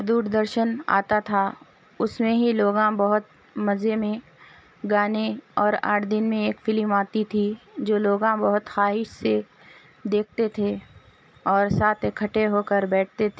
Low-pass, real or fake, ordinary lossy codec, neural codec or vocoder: none; real; none; none